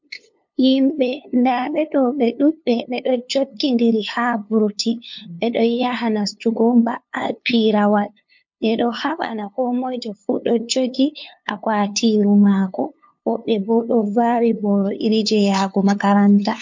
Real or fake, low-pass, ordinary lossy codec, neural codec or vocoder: fake; 7.2 kHz; MP3, 48 kbps; codec, 16 kHz, 2 kbps, FunCodec, trained on LibriTTS, 25 frames a second